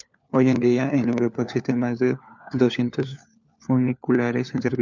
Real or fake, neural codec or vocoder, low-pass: fake; codec, 16 kHz, 4 kbps, FunCodec, trained on LibriTTS, 50 frames a second; 7.2 kHz